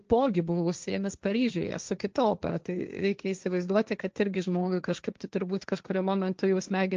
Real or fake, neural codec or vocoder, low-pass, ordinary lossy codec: fake; codec, 16 kHz, 1.1 kbps, Voila-Tokenizer; 7.2 kHz; Opus, 24 kbps